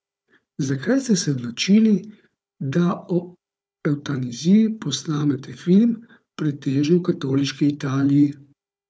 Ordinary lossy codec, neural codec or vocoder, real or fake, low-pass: none; codec, 16 kHz, 4 kbps, FunCodec, trained on Chinese and English, 50 frames a second; fake; none